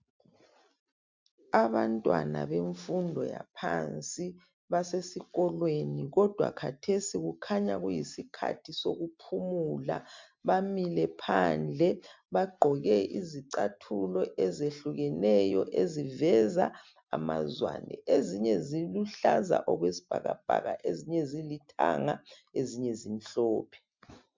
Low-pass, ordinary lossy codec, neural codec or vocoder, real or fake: 7.2 kHz; MP3, 64 kbps; none; real